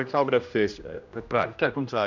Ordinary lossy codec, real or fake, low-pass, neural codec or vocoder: none; fake; 7.2 kHz; codec, 16 kHz, 1 kbps, X-Codec, HuBERT features, trained on general audio